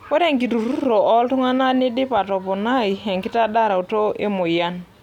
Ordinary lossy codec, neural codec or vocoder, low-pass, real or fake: none; none; 19.8 kHz; real